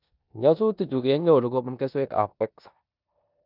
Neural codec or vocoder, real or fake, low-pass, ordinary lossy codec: codec, 16 kHz in and 24 kHz out, 0.9 kbps, LongCat-Audio-Codec, four codebook decoder; fake; 5.4 kHz; none